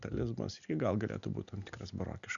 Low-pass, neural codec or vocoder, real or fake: 7.2 kHz; none; real